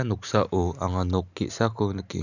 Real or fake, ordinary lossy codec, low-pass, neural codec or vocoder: real; none; 7.2 kHz; none